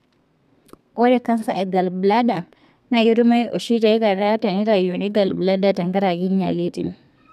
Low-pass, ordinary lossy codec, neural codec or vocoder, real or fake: 14.4 kHz; none; codec, 32 kHz, 1.9 kbps, SNAC; fake